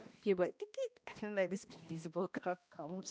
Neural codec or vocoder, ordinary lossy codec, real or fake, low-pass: codec, 16 kHz, 1 kbps, X-Codec, HuBERT features, trained on balanced general audio; none; fake; none